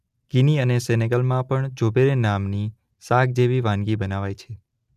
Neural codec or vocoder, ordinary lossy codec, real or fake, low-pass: none; none; real; 14.4 kHz